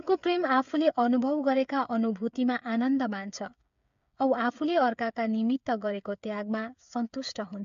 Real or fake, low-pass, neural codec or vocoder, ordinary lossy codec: fake; 7.2 kHz; codec, 16 kHz, 8 kbps, FreqCodec, smaller model; MP3, 64 kbps